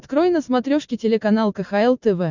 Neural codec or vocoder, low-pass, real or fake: none; 7.2 kHz; real